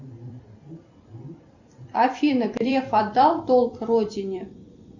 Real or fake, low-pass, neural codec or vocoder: fake; 7.2 kHz; vocoder, 44.1 kHz, 128 mel bands every 256 samples, BigVGAN v2